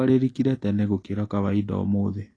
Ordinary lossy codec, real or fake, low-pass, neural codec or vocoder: AAC, 32 kbps; real; 9.9 kHz; none